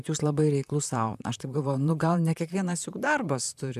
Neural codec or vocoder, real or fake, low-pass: vocoder, 44.1 kHz, 128 mel bands, Pupu-Vocoder; fake; 14.4 kHz